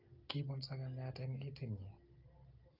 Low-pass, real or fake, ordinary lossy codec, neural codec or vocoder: 5.4 kHz; real; Opus, 32 kbps; none